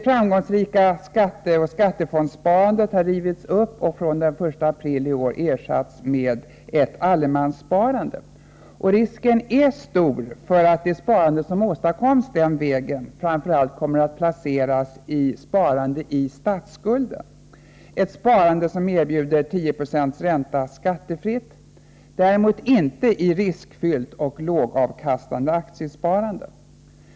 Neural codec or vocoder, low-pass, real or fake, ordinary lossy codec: none; none; real; none